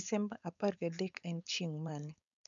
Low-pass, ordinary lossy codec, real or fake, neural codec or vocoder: 7.2 kHz; none; fake; codec, 16 kHz, 4.8 kbps, FACodec